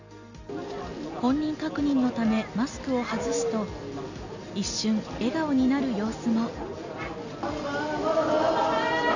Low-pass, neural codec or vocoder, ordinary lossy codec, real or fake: 7.2 kHz; none; none; real